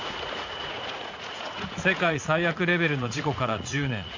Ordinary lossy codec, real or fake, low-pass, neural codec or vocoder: AAC, 48 kbps; fake; 7.2 kHz; vocoder, 44.1 kHz, 80 mel bands, Vocos